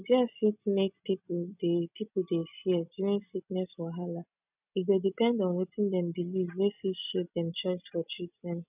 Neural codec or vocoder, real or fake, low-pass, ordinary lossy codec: none; real; 3.6 kHz; none